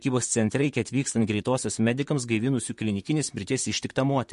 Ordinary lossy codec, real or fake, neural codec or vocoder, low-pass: MP3, 48 kbps; fake; vocoder, 44.1 kHz, 128 mel bands, Pupu-Vocoder; 14.4 kHz